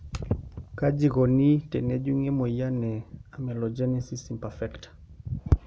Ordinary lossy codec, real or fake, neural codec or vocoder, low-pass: none; real; none; none